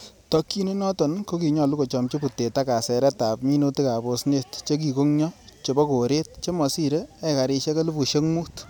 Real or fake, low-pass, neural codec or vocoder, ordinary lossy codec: real; none; none; none